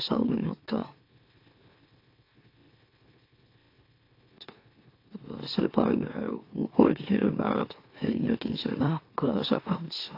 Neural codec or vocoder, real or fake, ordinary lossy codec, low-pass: autoencoder, 44.1 kHz, a latent of 192 numbers a frame, MeloTTS; fake; AAC, 32 kbps; 5.4 kHz